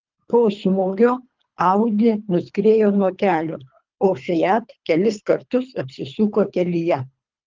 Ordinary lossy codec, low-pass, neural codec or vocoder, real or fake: Opus, 24 kbps; 7.2 kHz; codec, 24 kHz, 3 kbps, HILCodec; fake